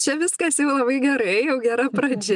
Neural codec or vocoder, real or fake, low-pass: none; real; 10.8 kHz